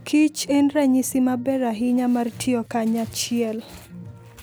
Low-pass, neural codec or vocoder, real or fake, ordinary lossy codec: none; none; real; none